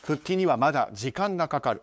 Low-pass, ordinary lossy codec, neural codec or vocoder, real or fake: none; none; codec, 16 kHz, 8 kbps, FunCodec, trained on LibriTTS, 25 frames a second; fake